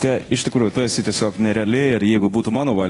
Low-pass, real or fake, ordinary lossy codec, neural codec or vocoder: 10.8 kHz; fake; AAC, 32 kbps; codec, 24 kHz, 0.9 kbps, DualCodec